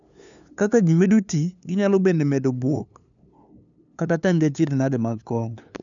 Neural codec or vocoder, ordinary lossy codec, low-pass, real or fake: codec, 16 kHz, 2 kbps, FunCodec, trained on LibriTTS, 25 frames a second; none; 7.2 kHz; fake